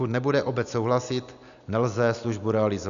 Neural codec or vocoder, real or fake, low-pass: none; real; 7.2 kHz